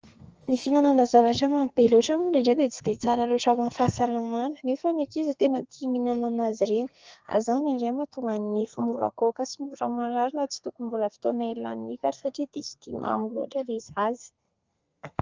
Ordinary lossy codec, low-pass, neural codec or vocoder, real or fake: Opus, 24 kbps; 7.2 kHz; codec, 32 kHz, 1.9 kbps, SNAC; fake